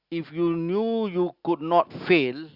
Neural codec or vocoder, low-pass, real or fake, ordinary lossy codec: none; 5.4 kHz; real; Opus, 64 kbps